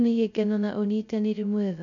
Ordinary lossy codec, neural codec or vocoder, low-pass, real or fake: none; codec, 16 kHz, 0.2 kbps, FocalCodec; 7.2 kHz; fake